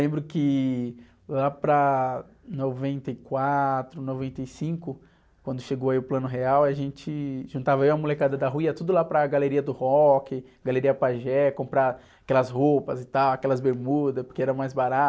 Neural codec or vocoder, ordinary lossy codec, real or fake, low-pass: none; none; real; none